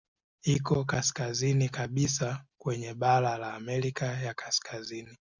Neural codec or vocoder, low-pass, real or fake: none; 7.2 kHz; real